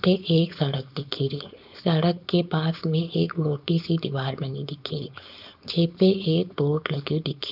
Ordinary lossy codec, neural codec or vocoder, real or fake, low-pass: none; codec, 16 kHz, 4.8 kbps, FACodec; fake; 5.4 kHz